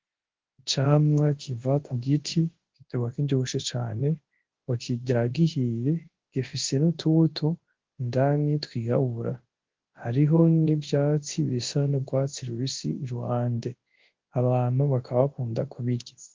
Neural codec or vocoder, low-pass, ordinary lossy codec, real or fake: codec, 24 kHz, 0.9 kbps, WavTokenizer, large speech release; 7.2 kHz; Opus, 16 kbps; fake